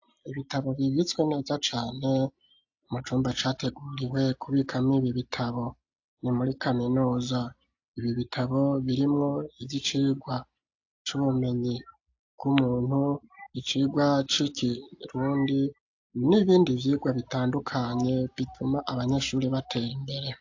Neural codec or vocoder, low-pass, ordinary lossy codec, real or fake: none; 7.2 kHz; AAC, 48 kbps; real